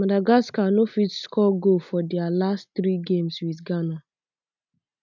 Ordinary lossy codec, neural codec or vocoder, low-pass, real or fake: none; none; 7.2 kHz; real